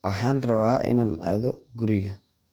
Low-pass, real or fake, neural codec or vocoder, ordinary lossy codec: none; fake; codec, 44.1 kHz, 2.6 kbps, DAC; none